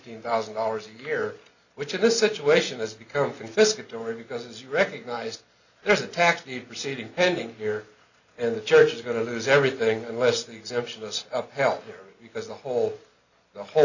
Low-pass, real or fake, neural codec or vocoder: 7.2 kHz; real; none